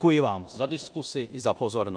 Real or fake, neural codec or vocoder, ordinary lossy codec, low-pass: fake; codec, 16 kHz in and 24 kHz out, 0.9 kbps, LongCat-Audio-Codec, four codebook decoder; AAC, 64 kbps; 9.9 kHz